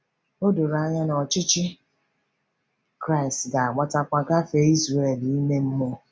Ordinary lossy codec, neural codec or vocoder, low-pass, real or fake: none; none; none; real